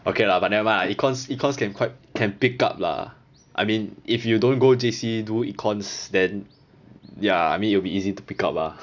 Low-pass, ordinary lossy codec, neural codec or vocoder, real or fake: 7.2 kHz; none; none; real